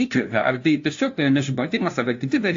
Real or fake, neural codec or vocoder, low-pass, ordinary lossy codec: fake; codec, 16 kHz, 0.5 kbps, FunCodec, trained on LibriTTS, 25 frames a second; 7.2 kHz; AAC, 48 kbps